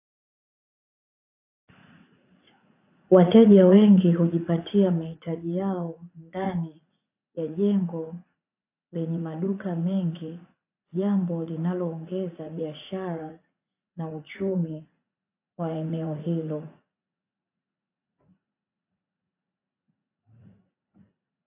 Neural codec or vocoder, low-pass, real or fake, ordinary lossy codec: vocoder, 44.1 kHz, 80 mel bands, Vocos; 3.6 kHz; fake; AAC, 24 kbps